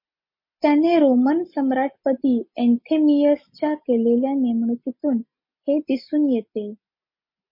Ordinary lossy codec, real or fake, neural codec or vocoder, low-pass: MP3, 32 kbps; real; none; 5.4 kHz